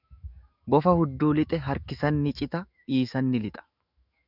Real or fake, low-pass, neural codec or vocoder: fake; 5.4 kHz; autoencoder, 48 kHz, 128 numbers a frame, DAC-VAE, trained on Japanese speech